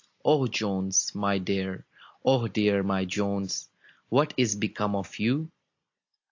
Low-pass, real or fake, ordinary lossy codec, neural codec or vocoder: 7.2 kHz; real; AAC, 48 kbps; none